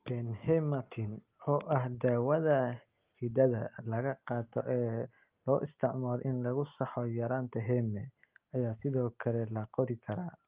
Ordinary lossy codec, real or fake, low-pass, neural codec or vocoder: Opus, 32 kbps; real; 3.6 kHz; none